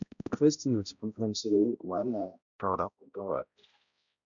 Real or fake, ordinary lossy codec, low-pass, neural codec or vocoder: fake; none; 7.2 kHz; codec, 16 kHz, 0.5 kbps, X-Codec, HuBERT features, trained on balanced general audio